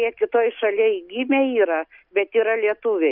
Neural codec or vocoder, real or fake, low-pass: none; real; 5.4 kHz